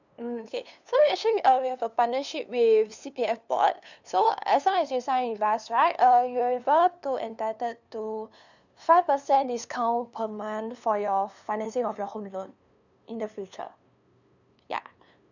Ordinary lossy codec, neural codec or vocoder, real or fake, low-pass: none; codec, 16 kHz, 2 kbps, FunCodec, trained on LibriTTS, 25 frames a second; fake; 7.2 kHz